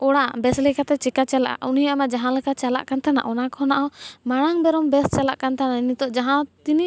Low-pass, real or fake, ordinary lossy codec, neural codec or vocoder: none; real; none; none